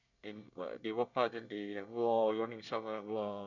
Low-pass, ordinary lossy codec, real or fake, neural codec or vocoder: 7.2 kHz; none; fake; codec, 24 kHz, 1 kbps, SNAC